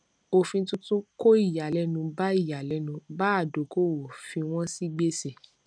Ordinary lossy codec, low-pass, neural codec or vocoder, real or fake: none; 9.9 kHz; none; real